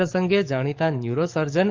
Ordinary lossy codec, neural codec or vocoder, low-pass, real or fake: Opus, 32 kbps; codec, 44.1 kHz, 7.8 kbps, DAC; 7.2 kHz; fake